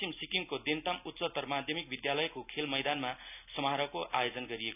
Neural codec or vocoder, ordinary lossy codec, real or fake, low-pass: none; none; real; 3.6 kHz